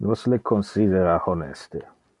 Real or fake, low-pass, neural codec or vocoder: real; 10.8 kHz; none